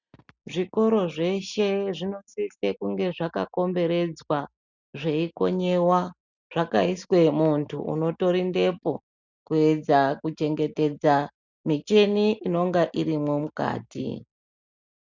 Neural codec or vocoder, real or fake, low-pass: none; real; 7.2 kHz